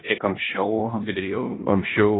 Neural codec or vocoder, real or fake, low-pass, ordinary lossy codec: codec, 16 kHz in and 24 kHz out, 0.9 kbps, LongCat-Audio-Codec, four codebook decoder; fake; 7.2 kHz; AAC, 16 kbps